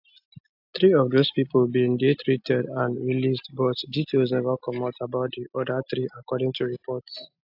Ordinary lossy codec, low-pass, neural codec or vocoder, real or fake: AAC, 48 kbps; 5.4 kHz; none; real